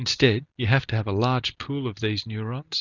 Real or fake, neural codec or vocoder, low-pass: real; none; 7.2 kHz